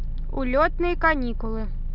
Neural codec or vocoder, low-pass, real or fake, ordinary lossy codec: none; 5.4 kHz; real; none